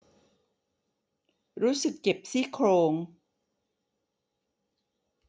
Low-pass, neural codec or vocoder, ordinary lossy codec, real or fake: none; none; none; real